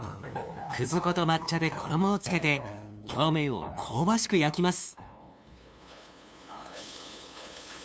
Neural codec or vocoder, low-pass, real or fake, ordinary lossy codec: codec, 16 kHz, 2 kbps, FunCodec, trained on LibriTTS, 25 frames a second; none; fake; none